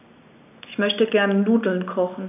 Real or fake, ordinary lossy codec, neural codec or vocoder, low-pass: real; none; none; 3.6 kHz